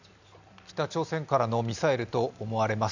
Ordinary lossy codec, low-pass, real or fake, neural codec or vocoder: none; 7.2 kHz; real; none